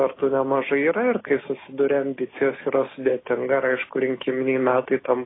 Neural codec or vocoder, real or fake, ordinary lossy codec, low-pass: none; real; AAC, 16 kbps; 7.2 kHz